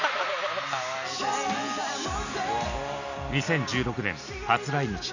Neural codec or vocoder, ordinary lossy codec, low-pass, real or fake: none; none; 7.2 kHz; real